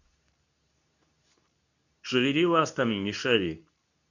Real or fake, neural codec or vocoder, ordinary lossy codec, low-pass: fake; codec, 24 kHz, 0.9 kbps, WavTokenizer, medium speech release version 2; none; 7.2 kHz